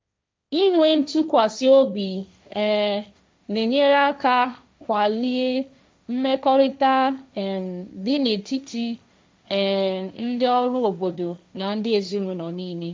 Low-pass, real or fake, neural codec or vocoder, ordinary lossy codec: none; fake; codec, 16 kHz, 1.1 kbps, Voila-Tokenizer; none